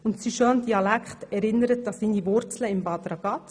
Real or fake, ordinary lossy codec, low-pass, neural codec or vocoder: real; none; 9.9 kHz; none